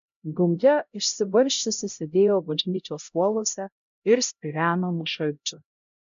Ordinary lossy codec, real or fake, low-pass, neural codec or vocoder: MP3, 64 kbps; fake; 7.2 kHz; codec, 16 kHz, 0.5 kbps, X-Codec, HuBERT features, trained on LibriSpeech